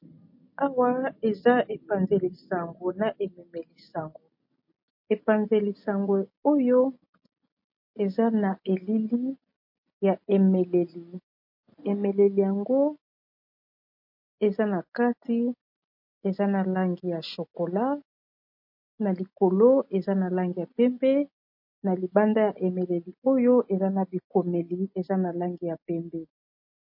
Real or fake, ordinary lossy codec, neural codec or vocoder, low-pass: real; MP3, 32 kbps; none; 5.4 kHz